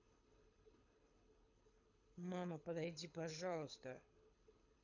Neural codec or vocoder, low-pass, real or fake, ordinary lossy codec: codec, 24 kHz, 6 kbps, HILCodec; 7.2 kHz; fake; none